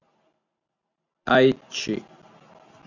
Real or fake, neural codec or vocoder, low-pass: real; none; 7.2 kHz